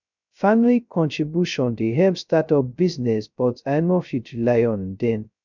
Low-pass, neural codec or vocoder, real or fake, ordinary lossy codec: 7.2 kHz; codec, 16 kHz, 0.2 kbps, FocalCodec; fake; none